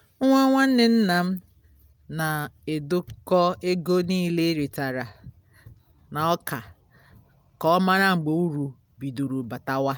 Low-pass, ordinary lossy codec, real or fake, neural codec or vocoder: none; none; real; none